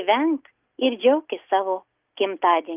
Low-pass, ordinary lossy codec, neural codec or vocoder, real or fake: 3.6 kHz; Opus, 24 kbps; none; real